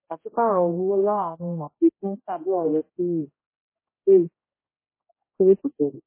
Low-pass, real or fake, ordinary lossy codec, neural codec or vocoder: 3.6 kHz; fake; MP3, 16 kbps; codec, 16 kHz, 0.5 kbps, X-Codec, HuBERT features, trained on general audio